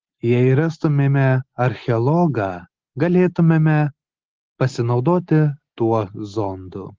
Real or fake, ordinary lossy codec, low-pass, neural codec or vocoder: real; Opus, 16 kbps; 7.2 kHz; none